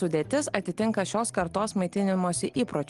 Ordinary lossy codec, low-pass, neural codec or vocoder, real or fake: Opus, 24 kbps; 10.8 kHz; none; real